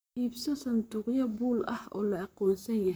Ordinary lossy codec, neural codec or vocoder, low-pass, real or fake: none; vocoder, 44.1 kHz, 128 mel bands, Pupu-Vocoder; none; fake